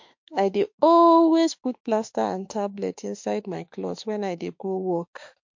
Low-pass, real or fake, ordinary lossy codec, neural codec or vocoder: 7.2 kHz; fake; MP3, 48 kbps; autoencoder, 48 kHz, 32 numbers a frame, DAC-VAE, trained on Japanese speech